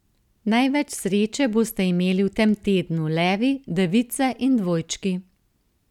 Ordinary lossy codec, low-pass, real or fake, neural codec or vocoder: none; 19.8 kHz; real; none